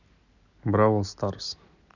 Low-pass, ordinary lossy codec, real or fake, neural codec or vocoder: 7.2 kHz; none; real; none